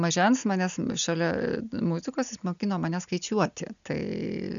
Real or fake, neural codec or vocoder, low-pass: real; none; 7.2 kHz